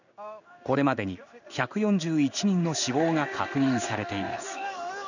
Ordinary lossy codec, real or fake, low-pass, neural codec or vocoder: none; fake; 7.2 kHz; codec, 16 kHz in and 24 kHz out, 1 kbps, XY-Tokenizer